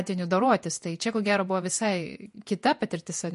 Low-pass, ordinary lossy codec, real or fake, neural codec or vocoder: 14.4 kHz; MP3, 48 kbps; fake; vocoder, 48 kHz, 128 mel bands, Vocos